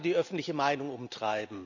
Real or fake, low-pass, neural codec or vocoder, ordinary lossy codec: real; 7.2 kHz; none; none